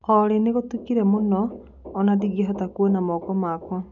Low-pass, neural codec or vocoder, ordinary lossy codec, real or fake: 7.2 kHz; none; none; real